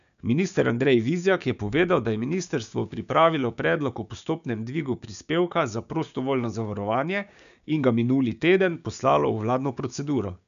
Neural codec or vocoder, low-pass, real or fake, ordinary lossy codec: codec, 16 kHz, 6 kbps, DAC; 7.2 kHz; fake; none